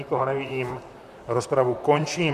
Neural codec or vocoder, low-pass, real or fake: vocoder, 44.1 kHz, 128 mel bands, Pupu-Vocoder; 14.4 kHz; fake